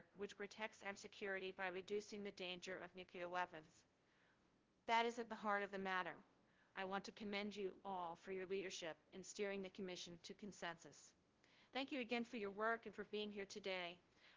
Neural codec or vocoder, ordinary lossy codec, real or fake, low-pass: codec, 16 kHz, 0.5 kbps, FunCodec, trained on LibriTTS, 25 frames a second; Opus, 16 kbps; fake; 7.2 kHz